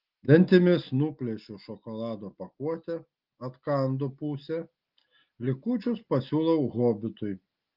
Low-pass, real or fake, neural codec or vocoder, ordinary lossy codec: 5.4 kHz; real; none; Opus, 32 kbps